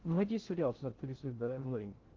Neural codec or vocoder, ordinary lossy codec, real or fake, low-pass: codec, 16 kHz in and 24 kHz out, 0.6 kbps, FocalCodec, streaming, 2048 codes; Opus, 16 kbps; fake; 7.2 kHz